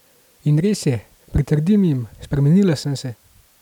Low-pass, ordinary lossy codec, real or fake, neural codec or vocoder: 19.8 kHz; none; real; none